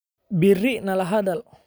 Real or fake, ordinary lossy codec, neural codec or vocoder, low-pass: real; none; none; none